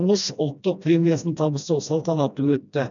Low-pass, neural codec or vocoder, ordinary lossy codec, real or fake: 7.2 kHz; codec, 16 kHz, 1 kbps, FreqCodec, smaller model; none; fake